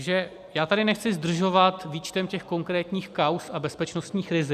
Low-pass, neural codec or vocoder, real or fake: 14.4 kHz; none; real